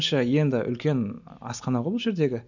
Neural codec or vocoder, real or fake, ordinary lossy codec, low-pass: none; real; none; 7.2 kHz